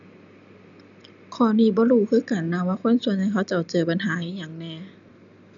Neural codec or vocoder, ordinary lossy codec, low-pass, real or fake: none; none; 7.2 kHz; real